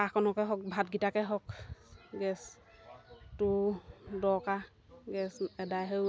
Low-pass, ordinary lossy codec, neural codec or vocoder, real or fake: none; none; none; real